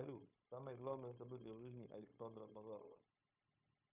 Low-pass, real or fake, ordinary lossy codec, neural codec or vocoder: 3.6 kHz; fake; Opus, 64 kbps; codec, 16 kHz, 0.9 kbps, LongCat-Audio-Codec